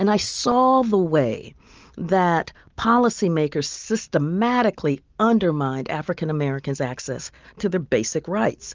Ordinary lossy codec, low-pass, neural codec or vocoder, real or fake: Opus, 24 kbps; 7.2 kHz; none; real